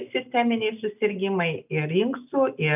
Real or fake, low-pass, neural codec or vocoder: real; 3.6 kHz; none